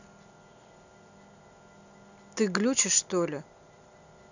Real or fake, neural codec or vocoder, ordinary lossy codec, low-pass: real; none; none; 7.2 kHz